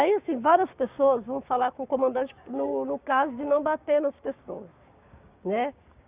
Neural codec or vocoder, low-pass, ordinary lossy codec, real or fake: codec, 16 kHz, 6 kbps, DAC; 3.6 kHz; Opus, 64 kbps; fake